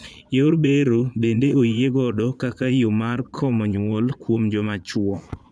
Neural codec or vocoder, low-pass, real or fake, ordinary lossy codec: vocoder, 22.05 kHz, 80 mel bands, Vocos; none; fake; none